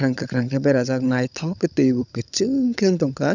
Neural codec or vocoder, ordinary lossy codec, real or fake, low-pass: codec, 16 kHz, 16 kbps, FunCodec, trained on LibriTTS, 50 frames a second; none; fake; 7.2 kHz